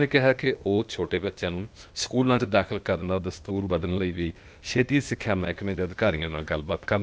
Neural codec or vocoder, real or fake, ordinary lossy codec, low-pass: codec, 16 kHz, 0.8 kbps, ZipCodec; fake; none; none